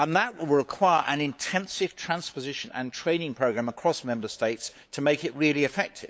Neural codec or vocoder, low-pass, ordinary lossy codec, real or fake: codec, 16 kHz, 8 kbps, FunCodec, trained on LibriTTS, 25 frames a second; none; none; fake